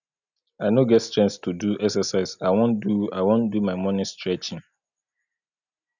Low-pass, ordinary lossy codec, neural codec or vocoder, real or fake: 7.2 kHz; none; none; real